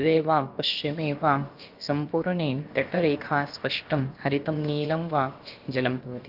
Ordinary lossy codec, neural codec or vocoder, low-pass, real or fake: Opus, 32 kbps; codec, 16 kHz, about 1 kbps, DyCAST, with the encoder's durations; 5.4 kHz; fake